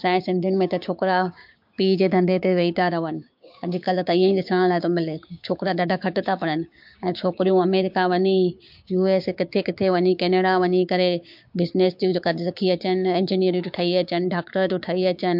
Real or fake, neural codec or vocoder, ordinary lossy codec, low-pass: fake; codec, 16 kHz, 6 kbps, DAC; MP3, 48 kbps; 5.4 kHz